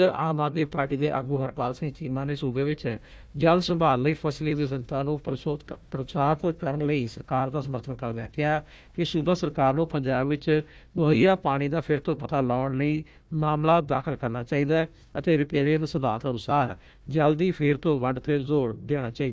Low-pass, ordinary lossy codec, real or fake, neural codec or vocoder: none; none; fake; codec, 16 kHz, 1 kbps, FunCodec, trained on Chinese and English, 50 frames a second